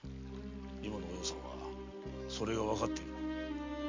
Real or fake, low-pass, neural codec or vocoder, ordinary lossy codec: real; 7.2 kHz; none; MP3, 48 kbps